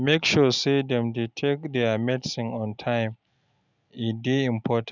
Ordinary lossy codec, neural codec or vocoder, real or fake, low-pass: none; none; real; 7.2 kHz